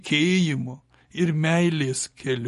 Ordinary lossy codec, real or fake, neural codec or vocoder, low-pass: MP3, 48 kbps; real; none; 14.4 kHz